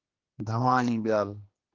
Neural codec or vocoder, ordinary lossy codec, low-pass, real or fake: codec, 16 kHz, 2 kbps, X-Codec, HuBERT features, trained on general audio; Opus, 16 kbps; 7.2 kHz; fake